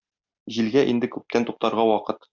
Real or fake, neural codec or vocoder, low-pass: real; none; 7.2 kHz